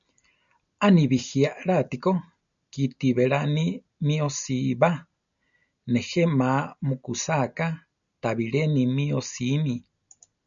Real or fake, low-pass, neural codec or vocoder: real; 7.2 kHz; none